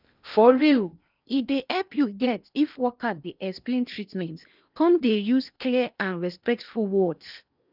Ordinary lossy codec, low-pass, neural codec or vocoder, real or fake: none; 5.4 kHz; codec, 16 kHz in and 24 kHz out, 0.8 kbps, FocalCodec, streaming, 65536 codes; fake